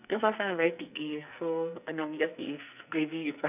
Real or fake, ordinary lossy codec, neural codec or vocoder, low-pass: fake; none; codec, 44.1 kHz, 2.6 kbps, SNAC; 3.6 kHz